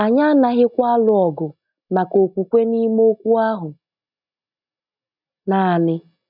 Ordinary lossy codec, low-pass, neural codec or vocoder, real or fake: none; 5.4 kHz; none; real